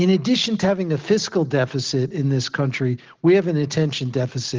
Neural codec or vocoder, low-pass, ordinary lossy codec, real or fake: none; 7.2 kHz; Opus, 16 kbps; real